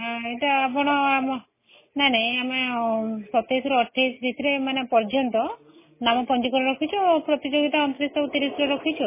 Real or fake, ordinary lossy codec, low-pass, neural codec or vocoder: real; MP3, 16 kbps; 3.6 kHz; none